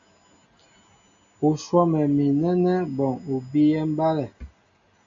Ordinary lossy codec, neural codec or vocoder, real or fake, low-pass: AAC, 64 kbps; none; real; 7.2 kHz